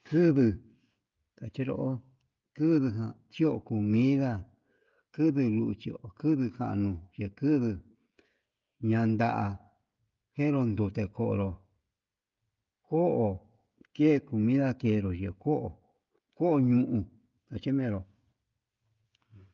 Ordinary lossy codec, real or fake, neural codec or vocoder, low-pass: Opus, 24 kbps; fake; codec, 16 kHz, 16 kbps, FreqCodec, smaller model; 7.2 kHz